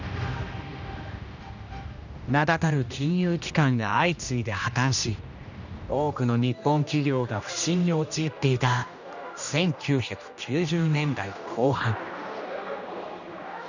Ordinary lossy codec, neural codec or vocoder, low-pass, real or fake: none; codec, 16 kHz, 1 kbps, X-Codec, HuBERT features, trained on general audio; 7.2 kHz; fake